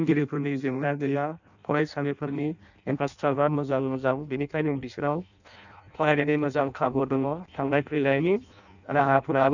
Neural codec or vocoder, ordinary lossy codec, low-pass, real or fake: codec, 16 kHz in and 24 kHz out, 0.6 kbps, FireRedTTS-2 codec; none; 7.2 kHz; fake